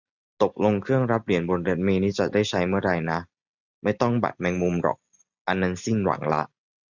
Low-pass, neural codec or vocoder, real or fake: 7.2 kHz; none; real